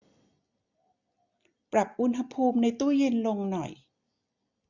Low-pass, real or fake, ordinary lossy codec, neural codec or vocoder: 7.2 kHz; real; none; none